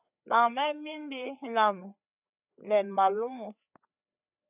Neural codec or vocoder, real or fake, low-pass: codec, 16 kHz, 4 kbps, FreqCodec, larger model; fake; 3.6 kHz